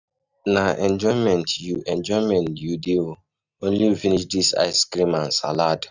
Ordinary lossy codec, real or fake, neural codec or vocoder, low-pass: none; real; none; 7.2 kHz